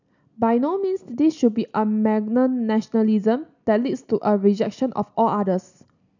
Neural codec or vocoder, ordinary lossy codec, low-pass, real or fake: none; none; 7.2 kHz; real